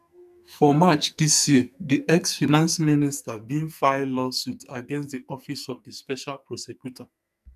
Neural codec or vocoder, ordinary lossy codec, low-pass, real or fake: codec, 44.1 kHz, 2.6 kbps, SNAC; none; 14.4 kHz; fake